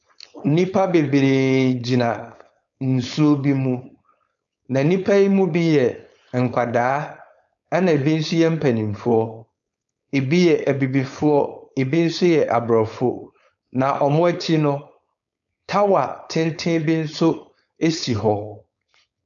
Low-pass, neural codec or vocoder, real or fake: 7.2 kHz; codec, 16 kHz, 4.8 kbps, FACodec; fake